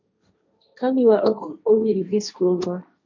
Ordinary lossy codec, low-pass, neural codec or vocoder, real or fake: MP3, 64 kbps; 7.2 kHz; codec, 16 kHz, 1.1 kbps, Voila-Tokenizer; fake